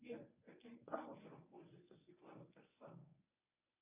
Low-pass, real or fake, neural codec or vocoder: 3.6 kHz; fake; codec, 24 kHz, 0.9 kbps, WavTokenizer, medium speech release version 1